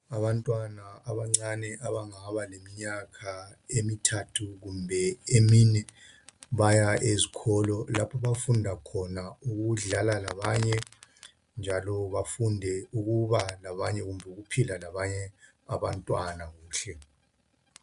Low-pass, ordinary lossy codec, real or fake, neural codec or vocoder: 10.8 kHz; AAC, 96 kbps; real; none